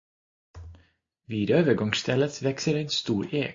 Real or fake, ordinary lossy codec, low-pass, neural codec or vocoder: real; AAC, 48 kbps; 7.2 kHz; none